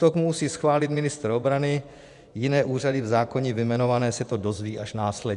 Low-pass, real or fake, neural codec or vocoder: 10.8 kHz; fake; vocoder, 24 kHz, 100 mel bands, Vocos